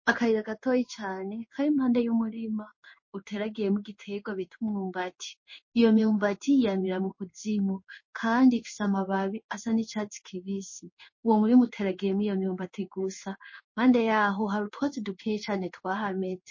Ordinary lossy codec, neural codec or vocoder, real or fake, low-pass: MP3, 32 kbps; codec, 16 kHz in and 24 kHz out, 1 kbps, XY-Tokenizer; fake; 7.2 kHz